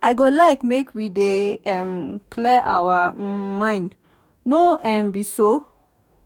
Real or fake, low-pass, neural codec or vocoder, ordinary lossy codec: fake; 19.8 kHz; codec, 44.1 kHz, 2.6 kbps, DAC; none